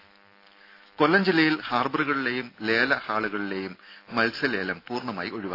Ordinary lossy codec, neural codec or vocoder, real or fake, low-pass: AAC, 32 kbps; none; real; 5.4 kHz